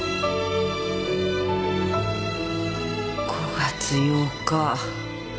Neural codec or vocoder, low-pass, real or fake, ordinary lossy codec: none; none; real; none